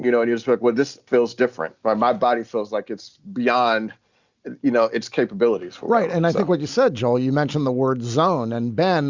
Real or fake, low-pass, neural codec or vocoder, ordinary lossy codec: real; 7.2 kHz; none; Opus, 64 kbps